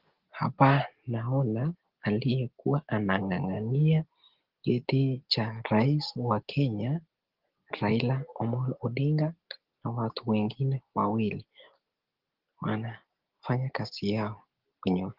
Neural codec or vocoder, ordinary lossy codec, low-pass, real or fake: none; Opus, 16 kbps; 5.4 kHz; real